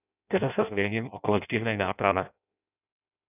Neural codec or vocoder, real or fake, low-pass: codec, 16 kHz in and 24 kHz out, 0.6 kbps, FireRedTTS-2 codec; fake; 3.6 kHz